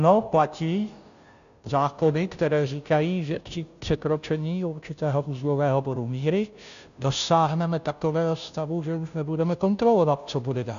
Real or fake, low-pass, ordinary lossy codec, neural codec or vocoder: fake; 7.2 kHz; MP3, 96 kbps; codec, 16 kHz, 0.5 kbps, FunCodec, trained on Chinese and English, 25 frames a second